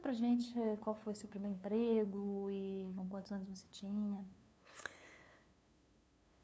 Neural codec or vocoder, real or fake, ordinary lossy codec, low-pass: codec, 16 kHz, 2 kbps, FunCodec, trained on LibriTTS, 25 frames a second; fake; none; none